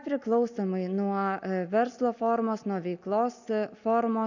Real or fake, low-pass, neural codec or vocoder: real; 7.2 kHz; none